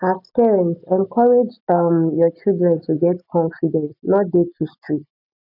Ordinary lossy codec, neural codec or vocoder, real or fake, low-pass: none; none; real; 5.4 kHz